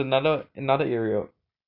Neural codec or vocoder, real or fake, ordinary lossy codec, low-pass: none; real; none; 5.4 kHz